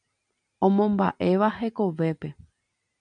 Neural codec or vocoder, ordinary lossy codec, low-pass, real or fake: none; MP3, 96 kbps; 9.9 kHz; real